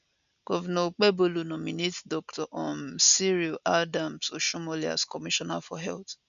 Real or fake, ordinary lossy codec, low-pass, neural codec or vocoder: real; none; 7.2 kHz; none